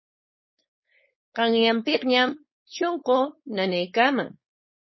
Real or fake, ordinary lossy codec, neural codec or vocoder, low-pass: fake; MP3, 24 kbps; codec, 16 kHz, 4.8 kbps, FACodec; 7.2 kHz